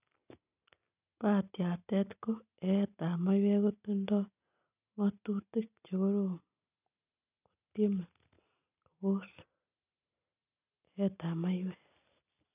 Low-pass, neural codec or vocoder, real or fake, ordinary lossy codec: 3.6 kHz; none; real; none